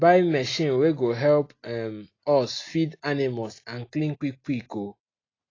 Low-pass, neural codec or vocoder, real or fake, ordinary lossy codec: 7.2 kHz; none; real; AAC, 32 kbps